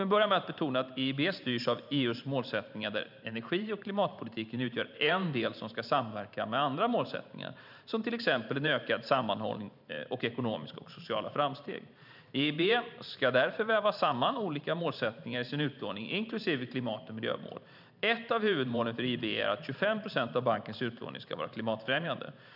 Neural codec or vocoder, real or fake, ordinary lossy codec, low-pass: vocoder, 44.1 kHz, 128 mel bands every 512 samples, BigVGAN v2; fake; none; 5.4 kHz